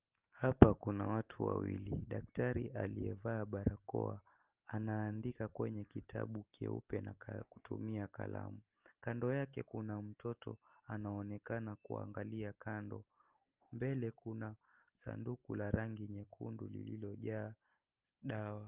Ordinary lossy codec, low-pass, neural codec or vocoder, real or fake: Opus, 32 kbps; 3.6 kHz; none; real